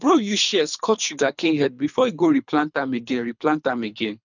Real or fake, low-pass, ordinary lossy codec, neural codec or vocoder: fake; 7.2 kHz; none; codec, 24 kHz, 3 kbps, HILCodec